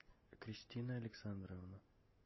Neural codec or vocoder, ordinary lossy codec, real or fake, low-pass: none; MP3, 24 kbps; real; 7.2 kHz